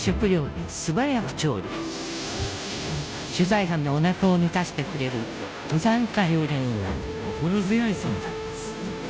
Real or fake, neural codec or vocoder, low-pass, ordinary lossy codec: fake; codec, 16 kHz, 0.5 kbps, FunCodec, trained on Chinese and English, 25 frames a second; none; none